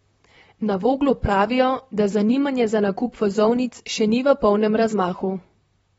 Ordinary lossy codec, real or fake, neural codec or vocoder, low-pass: AAC, 24 kbps; fake; vocoder, 44.1 kHz, 128 mel bands, Pupu-Vocoder; 19.8 kHz